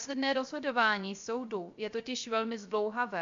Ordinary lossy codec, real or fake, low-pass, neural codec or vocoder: MP3, 64 kbps; fake; 7.2 kHz; codec, 16 kHz, 0.3 kbps, FocalCodec